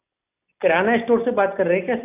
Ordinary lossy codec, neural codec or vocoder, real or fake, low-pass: none; none; real; 3.6 kHz